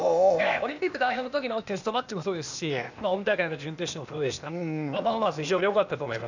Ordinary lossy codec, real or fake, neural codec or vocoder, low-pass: none; fake; codec, 16 kHz, 0.8 kbps, ZipCodec; 7.2 kHz